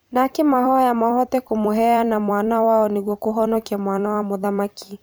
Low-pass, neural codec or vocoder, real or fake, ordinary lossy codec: none; vocoder, 44.1 kHz, 128 mel bands every 256 samples, BigVGAN v2; fake; none